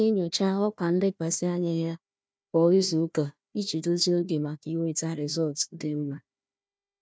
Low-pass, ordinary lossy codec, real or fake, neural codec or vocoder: none; none; fake; codec, 16 kHz, 1 kbps, FunCodec, trained on Chinese and English, 50 frames a second